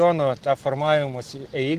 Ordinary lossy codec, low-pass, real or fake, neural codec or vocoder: Opus, 16 kbps; 14.4 kHz; real; none